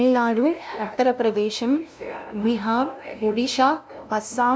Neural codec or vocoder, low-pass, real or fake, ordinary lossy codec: codec, 16 kHz, 0.5 kbps, FunCodec, trained on LibriTTS, 25 frames a second; none; fake; none